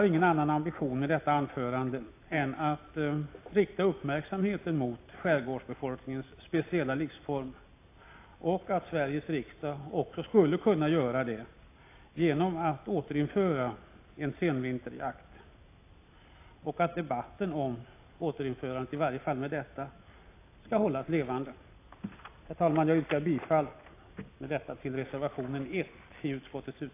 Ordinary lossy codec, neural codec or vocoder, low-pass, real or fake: AAC, 24 kbps; none; 3.6 kHz; real